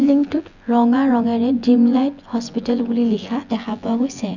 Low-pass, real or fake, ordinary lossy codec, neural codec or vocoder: 7.2 kHz; fake; none; vocoder, 24 kHz, 100 mel bands, Vocos